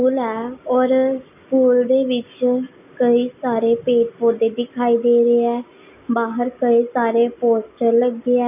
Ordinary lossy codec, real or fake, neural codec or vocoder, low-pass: none; real; none; 3.6 kHz